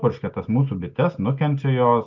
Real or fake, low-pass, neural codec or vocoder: real; 7.2 kHz; none